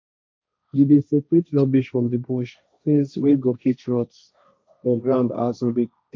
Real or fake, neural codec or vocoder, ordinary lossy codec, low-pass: fake; codec, 16 kHz, 1.1 kbps, Voila-Tokenizer; none; none